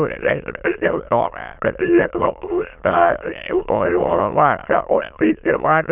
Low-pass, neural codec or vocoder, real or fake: 3.6 kHz; autoencoder, 22.05 kHz, a latent of 192 numbers a frame, VITS, trained on many speakers; fake